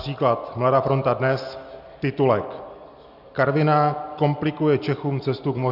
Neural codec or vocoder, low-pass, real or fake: none; 5.4 kHz; real